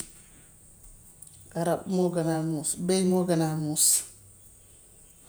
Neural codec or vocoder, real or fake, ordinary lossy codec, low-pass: vocoder, 48 kHz, 128 mel bands, Vocos; fake; none; none